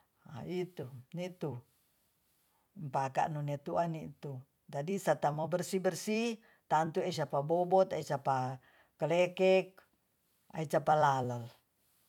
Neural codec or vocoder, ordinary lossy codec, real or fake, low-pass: vocoder, 44.1 kHz, 128 mel bands every 256 samples, BigVGAN v2; none; fake; 19.8 kHz